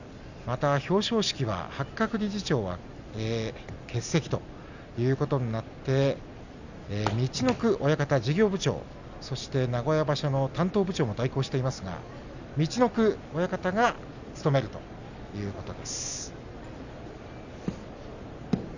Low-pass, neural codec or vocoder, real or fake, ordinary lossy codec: 7.2 kHz; none; real; none